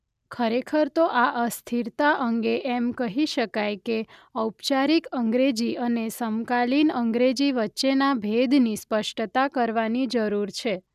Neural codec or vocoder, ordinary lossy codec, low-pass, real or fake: none; none; 14.4 kHz; real